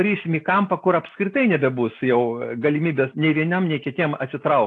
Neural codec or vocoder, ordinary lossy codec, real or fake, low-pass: none; AAC, 48 kbps; real; 10.8 kHz